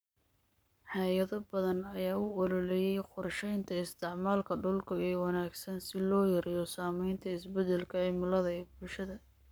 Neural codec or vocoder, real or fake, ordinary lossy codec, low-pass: codec, 44.1 kHz, 7.8 kbps, Pupu-Codec; fake; none; none